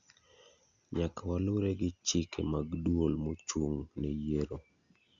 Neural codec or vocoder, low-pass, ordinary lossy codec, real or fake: none; 7.2 kHz; Opus, 64 kbps; real